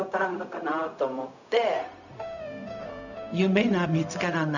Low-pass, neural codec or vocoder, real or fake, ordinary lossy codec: 7.2 kHz; codec, 16 kHz, 0.4 kbps, LongCat-Audio-Codec; fake; none